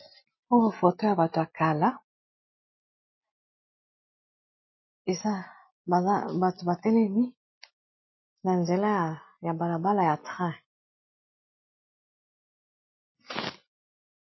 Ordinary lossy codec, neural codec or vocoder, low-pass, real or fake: MP3, 24 kbps; none; 7.2 kHz; real